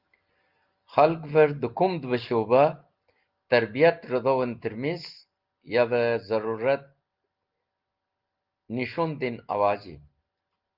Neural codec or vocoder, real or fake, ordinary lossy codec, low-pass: none; real; Opus, 32 kbps; 5.4 kHz